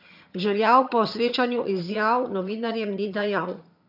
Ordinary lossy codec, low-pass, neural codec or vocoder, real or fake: none; 5.4 kHz; vocoder, 22.05 kHz, 80 mel bands, HiFi-GAN; fake